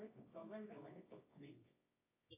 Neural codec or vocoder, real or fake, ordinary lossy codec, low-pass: codec, 24 kHz, 0.9 kbps, WavTokenizer, medium music audio release; fake; AAC, 24 kbps; 3.6 kHz